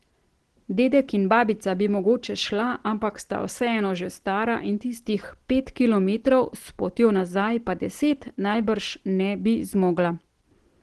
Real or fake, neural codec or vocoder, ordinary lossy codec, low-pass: real; none; Opus, 16 kbps; 10.8 kHz